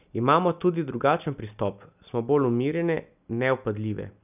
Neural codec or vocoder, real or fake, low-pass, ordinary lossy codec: none; real; 3.6 kHz; none